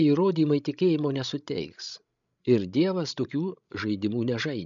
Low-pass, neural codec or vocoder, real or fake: 7.2 kHz; codec, 16 kHz, 16 kbps, FreqCodec, larger model; fake